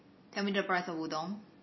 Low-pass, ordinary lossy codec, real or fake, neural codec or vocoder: 7.2 kHz; MP3, 24 kbps; fake; vocoder, 44.1 kHz, 128 mel bands every 256 samples, BigVGAN v2